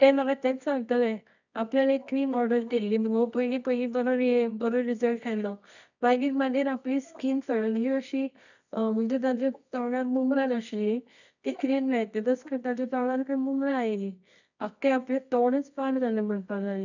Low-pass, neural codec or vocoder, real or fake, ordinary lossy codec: 7.2 kHz; codec, 24 kHz, 0.9 kbps, WavTokenizer, medium music audio release; fake; none